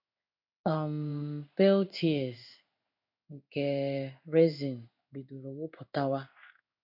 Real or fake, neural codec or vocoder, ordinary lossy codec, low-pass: fake; codec, 16 kHz in and 24 kHz out, 1 kbps, XY-Tokenizer; none; 5.4 kHz